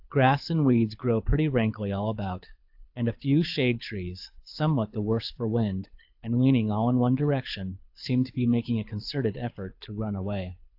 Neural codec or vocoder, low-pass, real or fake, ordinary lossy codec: codec, 24 kHz, 6 kbps, HILCodec; 5.4 kHz; fake; AAC, 48 kbps